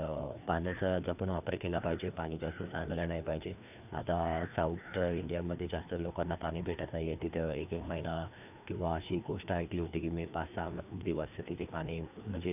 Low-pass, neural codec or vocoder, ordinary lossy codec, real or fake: 3.6 kHz; codec, 16 kHz, 2 kbps, FreqCodec, larger model; none; fake